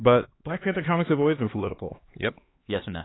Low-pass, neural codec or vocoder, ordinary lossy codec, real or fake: 7.2 kHz; codec, 16 kHz, 4 kbps, X-Codec, HuBERT features, trained on LibriSpeech; AAC, 16 kbps; fake